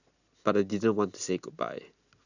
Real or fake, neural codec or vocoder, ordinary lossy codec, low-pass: real; none; none; 7.2 kHz